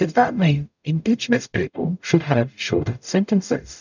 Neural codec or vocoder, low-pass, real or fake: codec, 44.1 kHz, 0.9 kbps, DAC; 7.2 kHz; fake